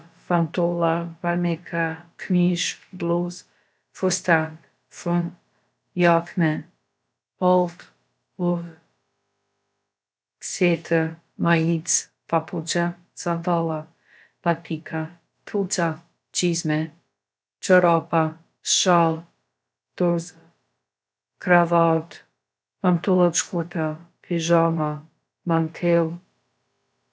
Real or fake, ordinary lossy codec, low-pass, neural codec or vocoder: fake; none; none; codec, 16 kHz, about 1 kbps, DyCAST, with the encoder's durations